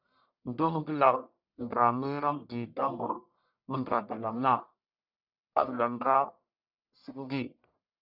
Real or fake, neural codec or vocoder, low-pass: fake; codec, 44.1 kHz, 1.7 kbps, Pupu-Codec; 5.4 kHz